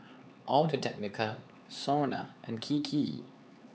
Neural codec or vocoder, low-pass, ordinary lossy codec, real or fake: codec, 16 kHz, 4 kbps, X-Codec, HuBERT features, trained on LibriSpeech; none; none; fake